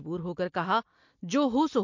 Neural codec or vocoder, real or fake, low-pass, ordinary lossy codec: none; real; 7.2 kHz; MP3, 48 kbps